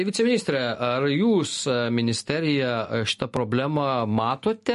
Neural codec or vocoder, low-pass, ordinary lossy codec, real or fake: none; 14.4 kHz; MP3, 48 kbps; real